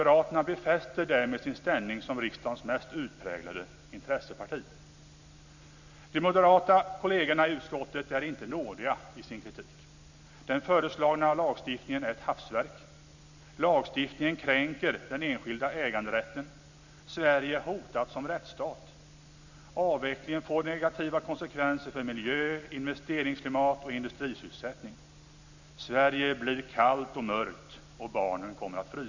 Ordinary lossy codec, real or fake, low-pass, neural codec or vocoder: none; real; 7.2 kHz; none